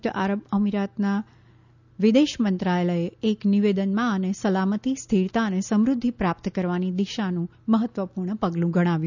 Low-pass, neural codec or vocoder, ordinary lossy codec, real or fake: 7.2 kHz; none; none; real